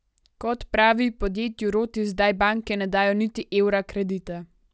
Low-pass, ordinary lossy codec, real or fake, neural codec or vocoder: none; none; real; none